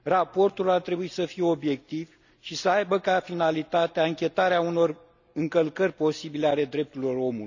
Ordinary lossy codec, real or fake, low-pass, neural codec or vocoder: none; real; 7.2 kHz; none